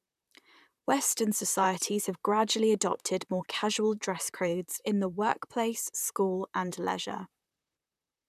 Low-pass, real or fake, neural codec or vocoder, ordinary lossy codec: 14.4 kHz; fake; vocoder, 44.1 kHz, 128 mel bands, Pupu-Vocoder; none